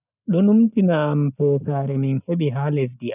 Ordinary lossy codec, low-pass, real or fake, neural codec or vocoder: none; 3.6 kHz; real; none